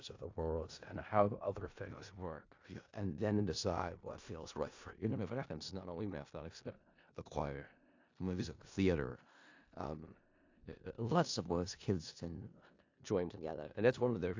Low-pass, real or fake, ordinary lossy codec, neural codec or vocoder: 7.2 kHz; fake; AAC, 48 kbps; codec, 16 kHz in and 24 kHz out, 0.4 kbps, LongCat-Audio-Codec, four codebook decoder